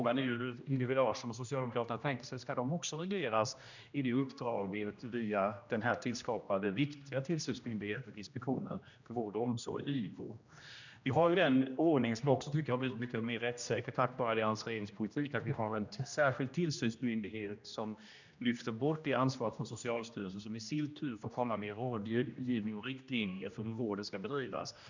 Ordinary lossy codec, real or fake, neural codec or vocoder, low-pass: none; fake; codec, 16 kHz, 1 kbps, X-Codec, HuBERT features, trained on general audio; 7.2 kHz